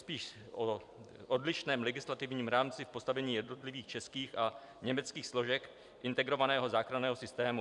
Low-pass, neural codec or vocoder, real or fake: 10.8 kHz; vocoder, 44.1 kHz, 128 mel bands every 256 samples, BigVGAN v2; fake